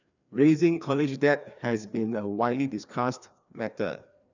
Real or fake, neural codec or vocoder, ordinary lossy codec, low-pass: fake; codec, 16 kHz, 2 kbps, FreqCodec, larger model; none; 7.2 kHz